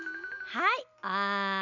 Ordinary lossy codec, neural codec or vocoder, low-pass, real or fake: none; none; 7.2 kHz; real